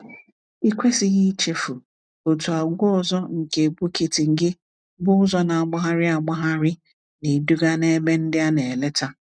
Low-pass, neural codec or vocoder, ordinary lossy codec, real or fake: 9.9 kHz; none; none; real